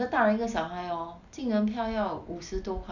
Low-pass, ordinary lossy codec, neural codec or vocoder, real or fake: 7.2 kHz; none; none; real